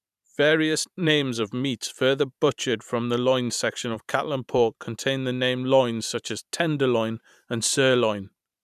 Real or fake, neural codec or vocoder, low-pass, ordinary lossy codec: fake; vocoder, 44.1 kHz, 128 mel bands every 512 samples, BigVGAN v2; 14.4 kHz; none